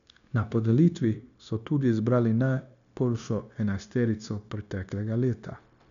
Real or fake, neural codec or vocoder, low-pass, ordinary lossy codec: fake; codec, 16 kHz, 0.9 kbps, LongCat-Audio-Codec; 7.2 kHz; MP3, 96 kbps